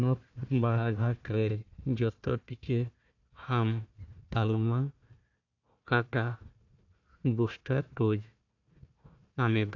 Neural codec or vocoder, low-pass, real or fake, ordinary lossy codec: codec, 16 kHz, 1 kbps, FunCodec, trained on Chinese and English, 50 frames a second; 7.2 kHz; fake; AAC, 48 kbps